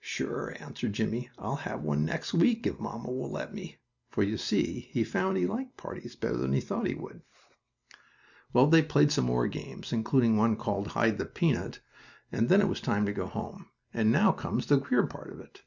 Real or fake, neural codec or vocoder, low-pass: real; none; 7.2 kHz